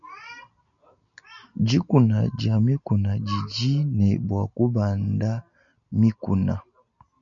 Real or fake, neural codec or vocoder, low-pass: real; none; 7.2 kHz